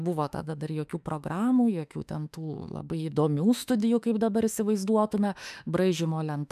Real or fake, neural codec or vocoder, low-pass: fake; autoencoder, 48 kHz, 32 numbers a frame, DAC-VAE, trained on Japanese speech; 14.4 kHz